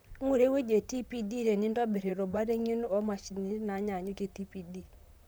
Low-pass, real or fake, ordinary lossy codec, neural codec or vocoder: none; fake; none; vocoder, 44.1 kHz, 128 mel bands, Pupu-Vocoder